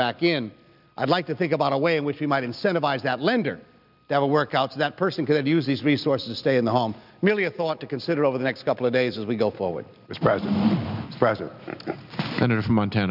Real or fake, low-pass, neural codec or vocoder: real; 5.4 kHz; none